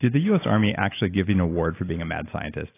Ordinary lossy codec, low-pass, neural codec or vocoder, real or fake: AAC, 24 kbps; 3.6 kHz; none; real